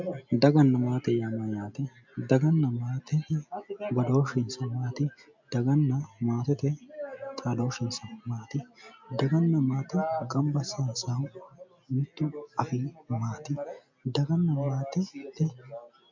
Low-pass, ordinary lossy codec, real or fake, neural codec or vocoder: 7.2 kHz; MP3, 64 kbps; real; none